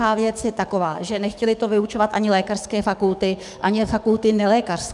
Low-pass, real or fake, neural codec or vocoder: 10.8 kHz; fake; codec, 44.1 kHz, 7.8 kbps, DAC